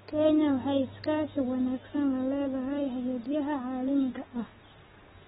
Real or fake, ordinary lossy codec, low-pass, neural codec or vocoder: real; AAC, 16 kbps; 19.8 kHz; none